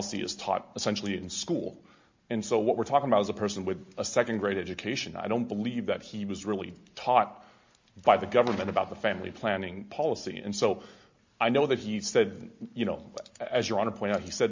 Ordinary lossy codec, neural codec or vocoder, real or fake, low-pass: MP3, 48 kbps; none; real; 7.2 kHz